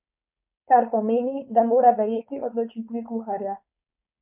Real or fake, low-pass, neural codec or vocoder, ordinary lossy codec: fake; 3.6 kHz; codec, 16 kHz, 4.8 kbps, FACodec; MP3, 32 kbps